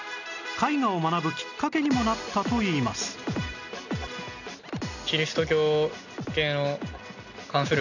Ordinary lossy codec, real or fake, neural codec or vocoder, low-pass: none; real; none; 7.2 kHz